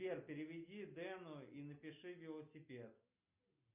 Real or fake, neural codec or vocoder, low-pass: real; none; 3.6 kHz